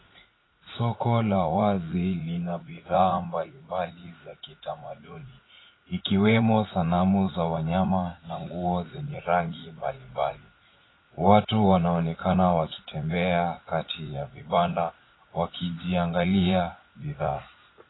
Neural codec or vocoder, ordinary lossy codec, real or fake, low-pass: vocoder, 44.1 kHz, 80 mel bands, Vocos; AAC, 16 kbps; fake; 7.2 kHz